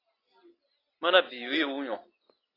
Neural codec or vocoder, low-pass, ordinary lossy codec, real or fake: none; 5.4 kHz; AAC, 24 kbps; real